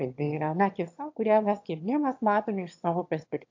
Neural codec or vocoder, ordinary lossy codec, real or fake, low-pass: autoencoder, 22.05 kHz, a latent of 192 numbers a frame, VITS, trained on one speaker; AAC, 48 kbps; fake; 7.2 kHz